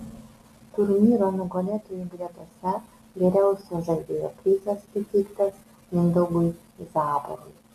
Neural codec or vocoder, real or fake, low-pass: none; real; 14.4 kHz